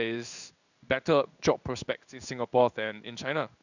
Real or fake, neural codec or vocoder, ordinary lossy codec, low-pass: fake; codec, 16 kHz in and 24 kHz out, 1 kbps, XY-Tokenizer; none; 7.2 kHz